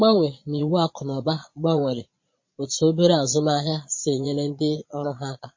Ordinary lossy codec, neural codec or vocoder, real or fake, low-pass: MP3, 32 kbps; vocoder, 22.05 kHz, 80 mel bands, Vocos; fake; 7.2 kHz